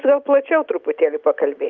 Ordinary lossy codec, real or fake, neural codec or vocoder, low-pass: Opus, 32 kbps; real; none; 7.2 kHz